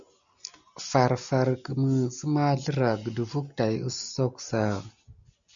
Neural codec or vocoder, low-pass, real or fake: none; 7.2 kHz; real